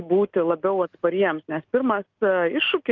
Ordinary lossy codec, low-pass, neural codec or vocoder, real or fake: Opus, 32 kbps; 7.2 kHz; none; real